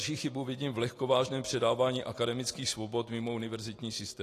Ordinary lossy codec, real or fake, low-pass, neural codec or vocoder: AAC, 48 kbps; real; 14.4 kHz; none